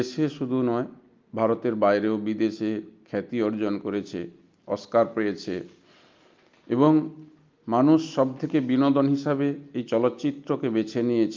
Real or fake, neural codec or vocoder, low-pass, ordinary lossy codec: real; none; 7.2 kHz; Opus, 24 kbps